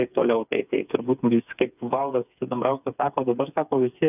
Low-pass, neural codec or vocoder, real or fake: 3.6 kHz; codec, 16 kHz, 4 kbps, FreqCodec, smaller model; fake